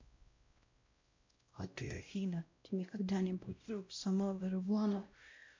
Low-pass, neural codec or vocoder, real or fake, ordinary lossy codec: 7.2 kHz; codec, 16 kHz, 0.5 kbps, X-Codec, WavLM features, trained on Multilingual LibriSpeech; fake; MP3, 48 kbps